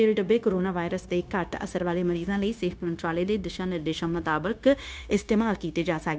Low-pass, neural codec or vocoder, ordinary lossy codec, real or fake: none; codec, 16 kHz, 0.9 kbps, LongCat-Audio-Codec; none; fake